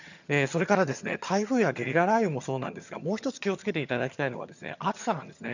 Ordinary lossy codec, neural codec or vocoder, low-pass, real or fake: none; vocoder, 22.05 kHz, 80 mel bands, HiFi-GAN; 7.2 kHz; fake